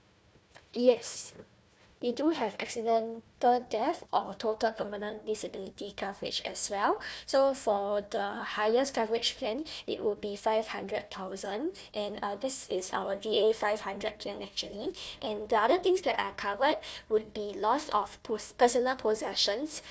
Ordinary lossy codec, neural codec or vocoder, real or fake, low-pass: none; codec, 16 kHz, 1 kbps, FunCodec, trained on Chinese and English, 50 frames a second; fake; none